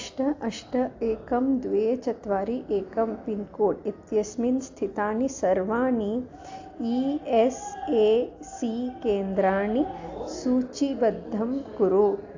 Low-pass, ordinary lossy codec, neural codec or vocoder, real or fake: 7.2 kHz; AAC, 48 kbps; none; real